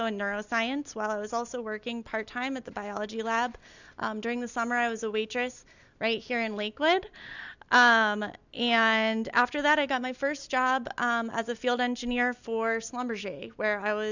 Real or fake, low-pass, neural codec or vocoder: real; 7.2 kHz; none